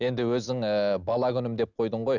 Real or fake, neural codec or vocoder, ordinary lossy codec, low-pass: real; none; none; 7.2 kHz